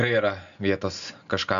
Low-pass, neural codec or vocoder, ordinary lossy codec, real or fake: 7.2 kHz; none; MP3, 96 kbps; real